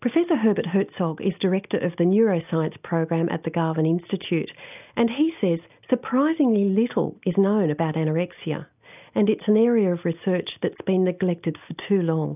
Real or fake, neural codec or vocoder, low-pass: real; none; 3.6 kHz